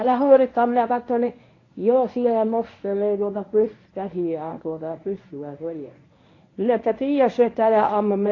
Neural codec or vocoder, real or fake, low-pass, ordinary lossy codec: codec, 24 kHz, 0.9 kbps, WavTokenizer, medium speech release version 1; fake; 7.2 kHz; AAC, 32 kbps